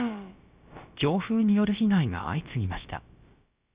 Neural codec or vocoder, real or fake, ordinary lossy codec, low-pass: codec, 16 kHz, about 1 kbps, DyCAST, with the encoder's durations; fake; Opus, 64 kbps; 3.6 kHz